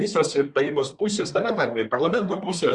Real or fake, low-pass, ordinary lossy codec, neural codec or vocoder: fake; 10.8 kHz; Opus, 64 kbps; codec, 24 kHz, 1 kbps, SNAC